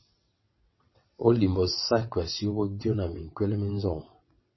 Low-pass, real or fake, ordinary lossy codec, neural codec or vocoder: 7.2 kHz; fake; MP3, 24 kbps; vocoder, 22.05 kHz, 80 mel bands, WaveNeXt